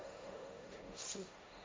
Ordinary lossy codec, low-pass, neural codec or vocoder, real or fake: none; none; codec, 16 kHz, 1.1 kbps, Voila-Tokenizer; fake